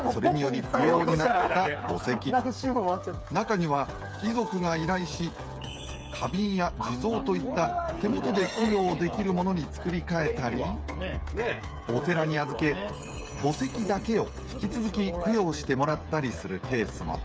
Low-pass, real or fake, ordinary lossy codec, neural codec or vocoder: none; fake; none; codec, 16 kHz, 8 kbps, FreqCodec, smaller model